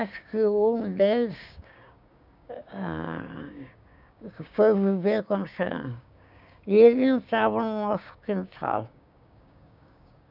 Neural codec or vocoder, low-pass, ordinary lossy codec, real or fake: codec, 16 kHz, 6 kbps, DAC; 5.4 kHz; none; fake